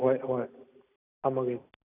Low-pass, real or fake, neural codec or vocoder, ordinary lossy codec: 3.6 kHz; real; none; none